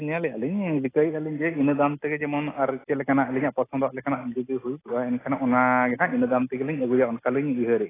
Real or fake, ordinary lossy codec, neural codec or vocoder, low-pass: real; AAC, 16 kbps; none; 3.6 kHz